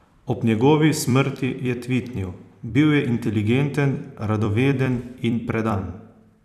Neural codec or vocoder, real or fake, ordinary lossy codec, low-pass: vocoder, 44.1 kHz, 128 mel bands every 256 samples, BigVGAN v2; fake; none; 14.4 kHz